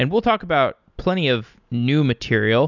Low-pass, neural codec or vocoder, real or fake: 7.2 kHz; none; real